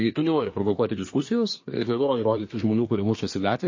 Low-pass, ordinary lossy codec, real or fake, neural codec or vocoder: 7.2 kHz; MP3, 32 kbps; fake; codec, 24 kHz, 1 kbps, SNAC